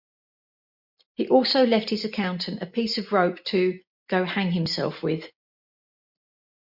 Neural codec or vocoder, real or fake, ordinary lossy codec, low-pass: none; real; MP3, 48 kbps; 5.4 kHz